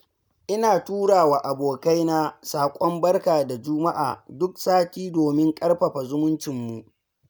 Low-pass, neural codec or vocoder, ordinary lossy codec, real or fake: none; none; none; real